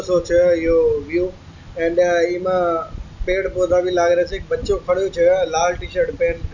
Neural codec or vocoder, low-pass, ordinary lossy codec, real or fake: none; 7.2 kHz; none; real